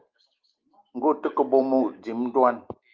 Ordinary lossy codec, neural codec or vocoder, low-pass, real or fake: Opus, 32 kbps; none; 7.2 kHz; real